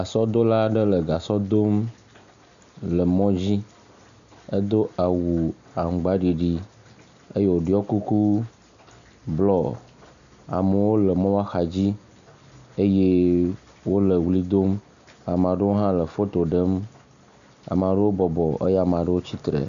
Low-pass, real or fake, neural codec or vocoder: 7.2 kHz; real; none